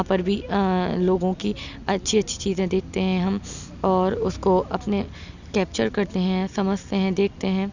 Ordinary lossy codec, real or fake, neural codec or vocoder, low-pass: none; real; none; 7.2 kHz